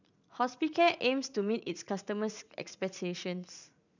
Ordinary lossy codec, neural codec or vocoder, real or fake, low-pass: none; vocoder, 44.1 kHz, 80 mel bands, Vocos; fake; 7.2 kHz